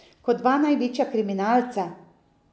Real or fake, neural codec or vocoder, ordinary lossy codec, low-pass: real; none; none; none